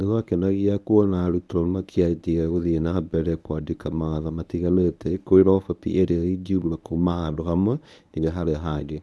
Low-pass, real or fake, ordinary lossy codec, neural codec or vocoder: none; fake; none; codec, 24 kHz, 0.9 kbps, WavTokenizer, medium speech release version 1